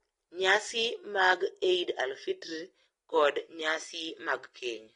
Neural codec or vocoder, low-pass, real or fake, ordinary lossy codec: none; 10.8 kHz; real; AAC, 32 kbps